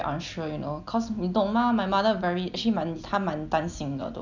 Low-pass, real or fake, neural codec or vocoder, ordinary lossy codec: 7.2 kHz; real; none; none